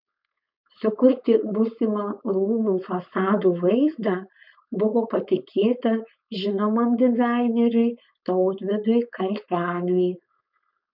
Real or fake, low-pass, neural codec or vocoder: fake; 5.4 kHz; codec, 16 kHz, 4.8 kbps, FACodec